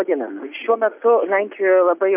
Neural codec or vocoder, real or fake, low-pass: none; real; 3.6 kHz